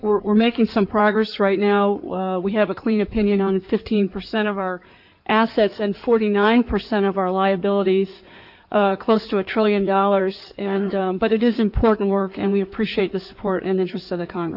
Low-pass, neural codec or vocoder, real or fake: 5.4 kHz; codec, 16 kHz in and 24 kHz out, 2.2 kbps, FireRedTTS-2 codec; fake